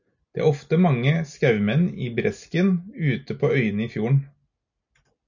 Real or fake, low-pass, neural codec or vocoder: real; 7.2 kHz; none